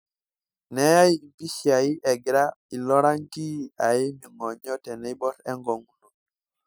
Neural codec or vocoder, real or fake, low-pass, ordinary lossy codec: none; real; none; none